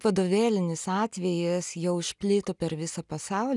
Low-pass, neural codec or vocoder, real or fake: 10.8 kHz; none; real